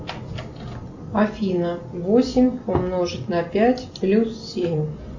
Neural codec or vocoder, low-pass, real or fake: none; 7.2 kHz; real